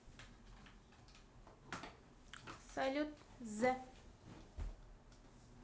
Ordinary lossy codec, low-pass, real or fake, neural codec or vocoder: none; none; real; none